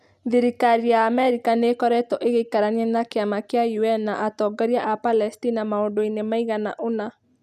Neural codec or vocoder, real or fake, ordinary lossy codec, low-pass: none; real; none; 14.4 kHz